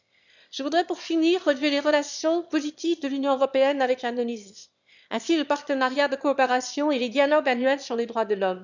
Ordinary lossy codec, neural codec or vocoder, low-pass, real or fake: none; autoencoder, 22.05 kHz, a latent of 192 numbers a frame, VITS, trained on one speaker; 7.2 kHz; fake